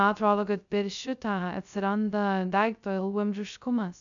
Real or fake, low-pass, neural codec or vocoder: fake; 7.2 kHz; codec, 16 kHz, 0.2 kbps, FocalCodec